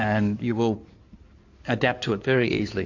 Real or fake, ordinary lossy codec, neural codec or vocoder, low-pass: fake; AAC, 48 kbps; codec, 16 kHz in and 24 kHz out, 2.2 kbps, FireRedTTS-2 codec; 7.2 kHz